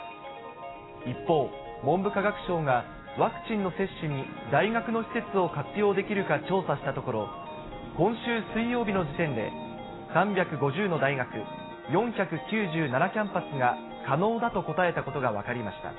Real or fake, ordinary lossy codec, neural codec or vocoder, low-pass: real; AAC, 16 kbps; none; 7.2 kHz